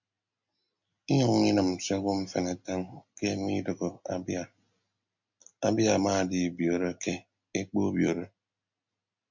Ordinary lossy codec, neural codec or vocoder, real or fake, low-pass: MP3, 64 kbps; none; real; 7.2 kHz